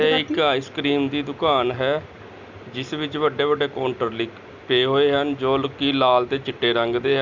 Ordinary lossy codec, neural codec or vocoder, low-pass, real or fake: Opus, 64 kbps; none; 7.2 kHz; real